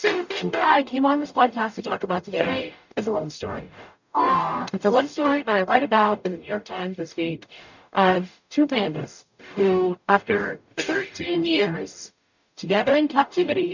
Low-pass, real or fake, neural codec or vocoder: 7.2 kHz; fake; codec, 44.1 kHz, 0.9 kbps, DAC